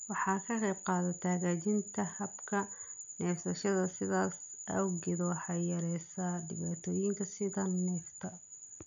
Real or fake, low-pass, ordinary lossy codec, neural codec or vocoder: real; 7.2 kHz; none; none